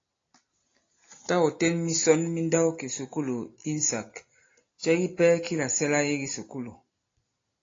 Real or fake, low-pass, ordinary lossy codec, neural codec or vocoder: real; 7.2 kHz; AAC, 32 kbps; none